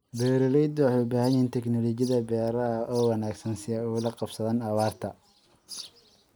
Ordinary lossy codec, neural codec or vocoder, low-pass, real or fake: none; none; none; real